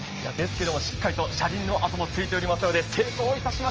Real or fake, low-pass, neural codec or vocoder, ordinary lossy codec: real; 7.2 kHz; none; Opus, 24 kbps